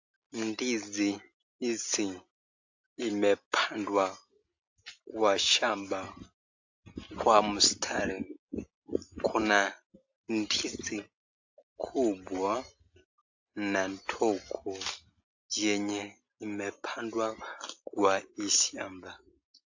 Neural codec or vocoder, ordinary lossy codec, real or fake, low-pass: none; AAC, 48 kbps; real; 7.2 kHz